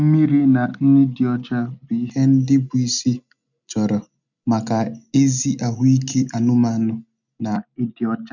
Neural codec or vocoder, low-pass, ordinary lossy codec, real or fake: none; 7.2 kHz; none; real